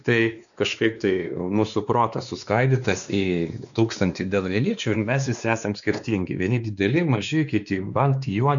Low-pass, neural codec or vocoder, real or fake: 7.2 kHz; codec, 16 kHz, 2 kbps, X-Codec, WavLM features, trained on Multilingual LibriSpeech; fake